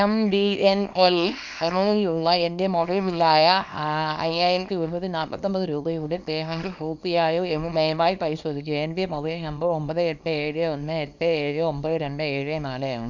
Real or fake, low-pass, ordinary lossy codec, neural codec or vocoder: fake; 7.2 kHz; none; codec, 24 kHz, 0.9 kbps, WavTokenizer, small release